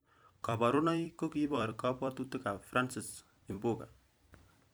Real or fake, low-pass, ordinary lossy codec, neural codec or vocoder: fake; none; none; vocoder, 44.1 kHz, 128 mel bands every 256 samples, BigVGAN v2